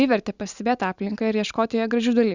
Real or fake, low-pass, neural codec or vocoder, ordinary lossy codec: real; 7.2 kHz; none; Opus, 64 kbps